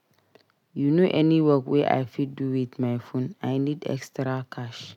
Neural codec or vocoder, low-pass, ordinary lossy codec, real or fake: none; 19.8 kHz; none; real